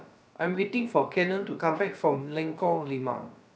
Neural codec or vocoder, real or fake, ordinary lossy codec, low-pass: codec, 16 kHz, about 1 kbps, DyCAST, with the encoder's durations; fake; none; none